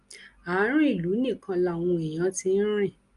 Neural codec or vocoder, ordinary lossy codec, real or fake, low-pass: none; Opus, 32 kbps; real; 10.8 kHz